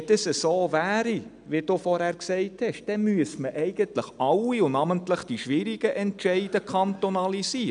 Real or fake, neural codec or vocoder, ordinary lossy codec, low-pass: real; none; none; 9.9 kHz